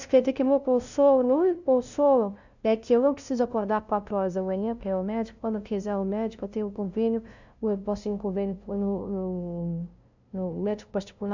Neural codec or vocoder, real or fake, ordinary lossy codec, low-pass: codec, 16 kHz, 0.5 kbps, FunCodec, trained on LibriTTS, 25 frames a second; fake; none; 7.2 kHz